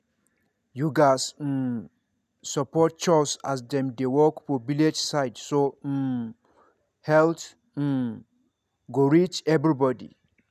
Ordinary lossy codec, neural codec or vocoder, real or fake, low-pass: none; none; real; 14.4 kHz